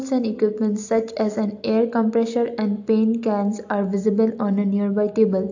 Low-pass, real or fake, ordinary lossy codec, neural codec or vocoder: 7.2 kHz; real; AAC, 48 kbps; none